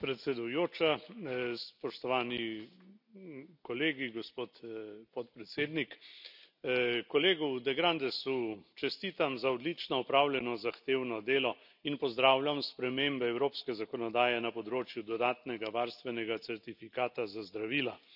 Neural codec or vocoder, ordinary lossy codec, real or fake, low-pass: none; none; real; 5.4 kHz